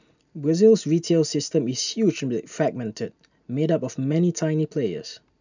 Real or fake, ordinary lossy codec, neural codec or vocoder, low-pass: real; none; none; 7.2 kHz